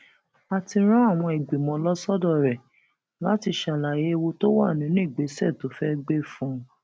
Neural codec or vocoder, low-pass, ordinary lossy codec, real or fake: none; none; none; real